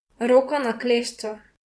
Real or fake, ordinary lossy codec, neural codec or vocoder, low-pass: fake; none; vocoder, 22.05 kHz, 80 mel bands, WaveNeXt; none